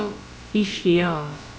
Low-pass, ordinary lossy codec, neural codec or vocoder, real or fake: none; none; codec, 16 kHz, about 1 kbps, DyCAST, with the encoder's durations; fake